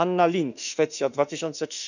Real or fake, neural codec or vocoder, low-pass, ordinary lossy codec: fake; autoencoder, 48 kHz, 32 numbers a frame, DAC-VAE, trained on Japanese speech; 7.2 kHz; none